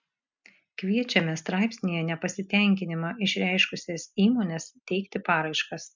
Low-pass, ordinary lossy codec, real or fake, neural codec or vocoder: 7.2 kHz; MP3, 64 kbps; real; none